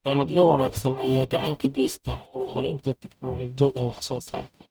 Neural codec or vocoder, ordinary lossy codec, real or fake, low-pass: codec, 44.1 kHz, 0.9 kbps, DAC; none; fake; none